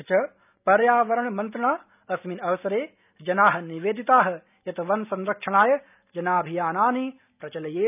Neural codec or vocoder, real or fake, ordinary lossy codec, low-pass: none; real; none; 3.6 kHz